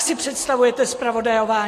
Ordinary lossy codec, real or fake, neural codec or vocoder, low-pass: AAC, 48 kbps; real; none; 14.4 kHz